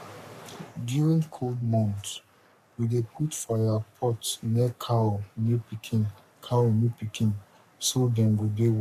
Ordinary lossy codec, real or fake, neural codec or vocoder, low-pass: none; fake; codec, 44.1 kHz, 3.4 kbps, Pupu-Codec; 14.4 kHz